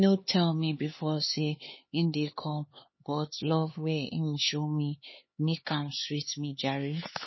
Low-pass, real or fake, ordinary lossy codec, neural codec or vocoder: 7.2 kHz; fake; MP3, 24 kbps; codec, 16 kHz, 4 kbps, X-Codec, HuBERT features, trained on LibriSpeech